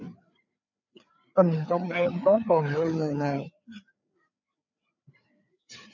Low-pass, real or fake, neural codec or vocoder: 7.2 kHz; fake; codec, 16 kHz, 4 kbps, FreqCodec, larger model